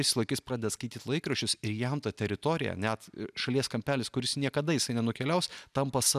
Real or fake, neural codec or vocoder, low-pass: real; none; 14.4 kHz